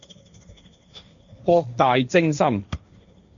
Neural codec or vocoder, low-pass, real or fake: codec, 16 kHz, 1.1 kbps, Voila-Tokenizer; 7.2 kHz; fake